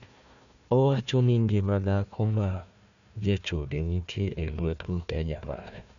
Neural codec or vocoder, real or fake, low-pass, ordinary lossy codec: codec, 16 kHz, 1 kbps, FunCodec, trained on Chinese and English, 50 frames a second; fake; 7.2 kHz; none